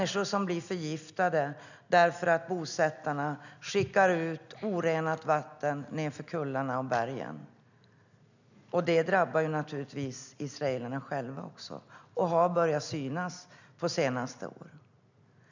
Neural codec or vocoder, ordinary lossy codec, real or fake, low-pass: none; none; real; 7.2 kHz